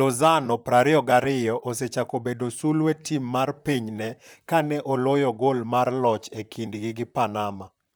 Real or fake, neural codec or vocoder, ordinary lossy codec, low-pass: fake; vocoder, 44.1 kHz, 128 mel bands, Pupu-Vocoder; none; none